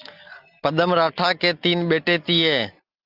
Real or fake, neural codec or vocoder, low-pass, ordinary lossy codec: real; none; 5.4 kHz; Opus, 24 kbps